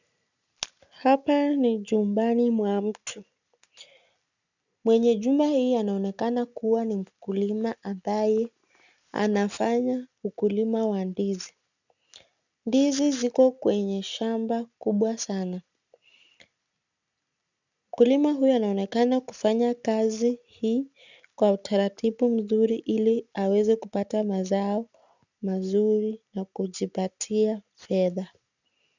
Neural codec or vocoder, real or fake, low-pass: none; real; 7.2 kHz